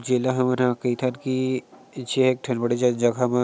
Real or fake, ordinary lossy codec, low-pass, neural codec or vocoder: real; none; none; none